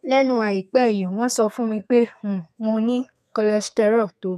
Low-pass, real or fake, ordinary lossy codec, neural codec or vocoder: 14.4 kHz; fake; none; codec, 32 kHz, 1.9 kbps, SNAC